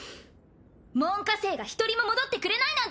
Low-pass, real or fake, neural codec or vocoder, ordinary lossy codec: none; real; none; none